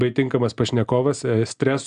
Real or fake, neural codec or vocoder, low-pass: real; none; 9.9 kHz